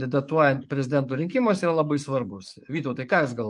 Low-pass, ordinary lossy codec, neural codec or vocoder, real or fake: 10.8 kHz; MP3, 64 kbps; codec, 44.1 kHz, 7.8 kbps, DAC; fake